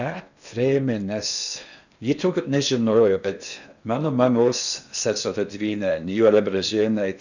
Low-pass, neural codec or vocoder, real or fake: 7.2 kHz; codec, 16 kHz in and 24 kHz out, 0.8 kbps, FocalCodec, streaming, 65536 codes; fake